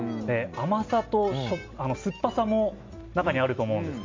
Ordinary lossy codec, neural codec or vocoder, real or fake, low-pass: MP3, 48 kbps; none; real; 7.2 kHz